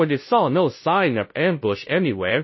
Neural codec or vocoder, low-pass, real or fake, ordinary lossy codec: codec, 16 kHz, 0.5 kbps, FunCodec, trained on Chinese and English, 25 frames a second; 7.2 kHz; fake; MP3, 24 kbps